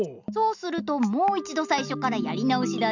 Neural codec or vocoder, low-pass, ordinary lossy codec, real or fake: none; 7.2 kHz; none; real